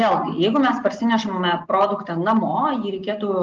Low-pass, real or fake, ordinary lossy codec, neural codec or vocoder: 7.2 kHz; real; Opus, 32 kbps; none